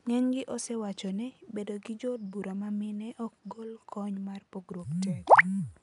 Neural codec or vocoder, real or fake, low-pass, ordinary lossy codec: none; real; 10.8 kHz; none